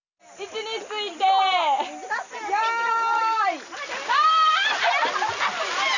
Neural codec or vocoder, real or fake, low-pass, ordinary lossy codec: none; real; 7.2 kHz; none